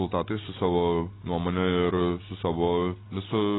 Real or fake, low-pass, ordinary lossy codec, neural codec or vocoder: real; 7.2 kHz; AAC, 16 kbps; none